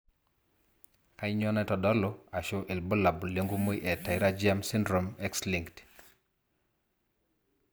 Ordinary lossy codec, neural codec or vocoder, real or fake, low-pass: none; none; real; none